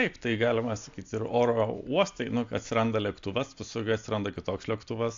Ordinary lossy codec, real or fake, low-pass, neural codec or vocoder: AAC, 64 kbps; real; 7.2 kHz; none